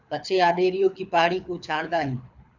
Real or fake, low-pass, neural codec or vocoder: fake; 7.2 kHz; codec, 24 kHz, 6 kbps, HILCodec